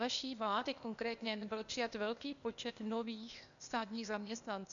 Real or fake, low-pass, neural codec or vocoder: fake; 7.2 kHz; codec, 16 kHz, 0.8 kbps, ZipCodec